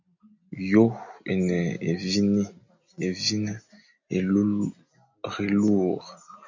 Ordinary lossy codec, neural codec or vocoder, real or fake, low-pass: MP3, 64 kbps; none; real; 7.2 kHz